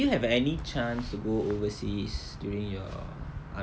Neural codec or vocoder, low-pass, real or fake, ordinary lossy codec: none; none; real; none